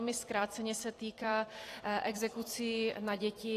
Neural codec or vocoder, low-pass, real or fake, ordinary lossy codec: vocoder, 44.1 kHz, 128 mel bands every 256 samples, BigVGAN v2; 14.4 kHz; fake; AAC, 48 kbps